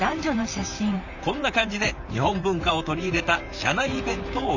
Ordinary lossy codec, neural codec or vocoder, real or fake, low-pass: none; vocoder, 44.1 kHz, 128 mel bands, Pupu-Vocoder; fake; 7.2 kHz